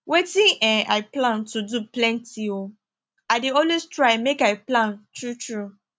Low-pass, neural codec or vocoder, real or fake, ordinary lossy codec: none; none; real; none